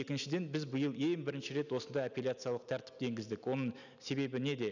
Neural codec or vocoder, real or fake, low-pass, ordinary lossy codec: none; real; 7.2 kHz; none